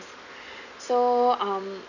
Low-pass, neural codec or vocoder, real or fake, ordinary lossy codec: 7.2 kHz; none; real; none